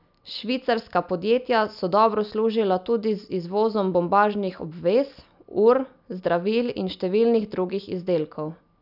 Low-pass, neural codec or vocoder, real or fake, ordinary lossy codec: 5.4 kHz; none; real; none